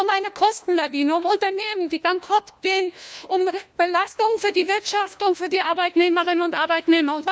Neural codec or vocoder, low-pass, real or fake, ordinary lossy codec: codec, 16 kHz, 1 kbps, FunCodec, trained on LibriTTS, 50 frames a second; none; fake; none